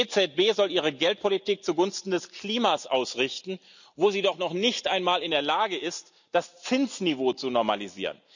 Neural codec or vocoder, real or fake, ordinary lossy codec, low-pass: none; real; none; 7.2 kHz